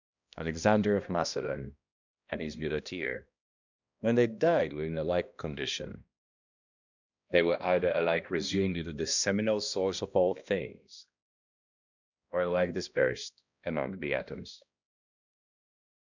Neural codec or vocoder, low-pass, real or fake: codec, 16 kHz, 1 kbps, X-Codec, HuBERT features, trained on balanced general audio; 7.2 kHz; fake